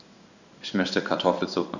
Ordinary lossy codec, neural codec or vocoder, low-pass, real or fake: none; codec, 16 kHz in and 24 kHz out, 1 kbps, XY-Tokenizer; 7.2 kHz; fake